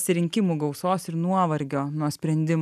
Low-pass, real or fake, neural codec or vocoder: 14.4 kHz; real; none